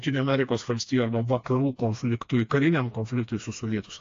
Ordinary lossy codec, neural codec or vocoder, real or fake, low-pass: AAC, 48 kbps; codec, 16 kHz, 2 kbps, FreqCodec, smaller model; fake; 7.2 kHz